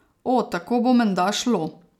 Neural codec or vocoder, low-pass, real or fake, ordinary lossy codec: none; 19.8 kHz; real; none